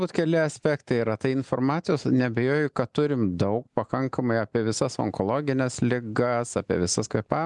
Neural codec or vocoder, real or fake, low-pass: none; real; 10.8 kHz